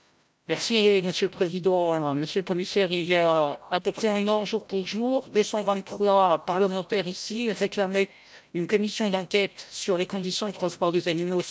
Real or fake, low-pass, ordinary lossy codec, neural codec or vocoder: fake; none; none; codec, 16 kHz, 0.5 kbps, FreqCodec, larger model